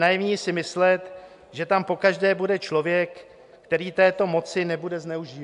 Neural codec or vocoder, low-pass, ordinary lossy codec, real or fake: none; 10.8 kHz; MP3, 64 kbps; real